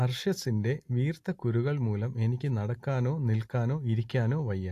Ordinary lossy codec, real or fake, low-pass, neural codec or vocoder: AAC, 64 kbps; real; 14.4 kHz; none